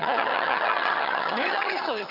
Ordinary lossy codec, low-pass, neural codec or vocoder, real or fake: none; 5.4 kHz; vocoder, 22.05 kHz, 80 mel bands, HiFi-GAN; fake